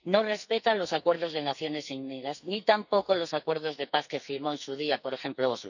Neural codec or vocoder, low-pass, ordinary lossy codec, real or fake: codec, 44.1 kHz, 2.6 kbps, SNAC; 7.2 kHz; none; fake